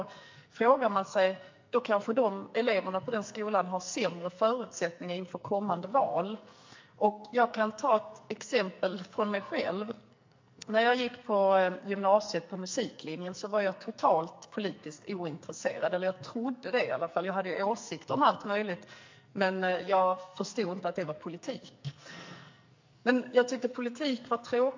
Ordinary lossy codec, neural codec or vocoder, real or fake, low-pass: MP3, 48 kbps; codec, 44.1 kHz, 2.6 kbps, SNAC; fake; 7.2 kHz